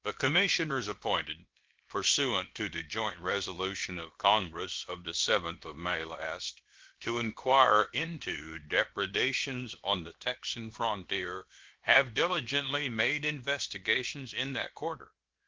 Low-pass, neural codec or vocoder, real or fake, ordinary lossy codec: 7.2 kHz; codec, 16 kHz, about 1 kbps, DyCAST, with the encoder's durations; fake; Opus, 16 kbps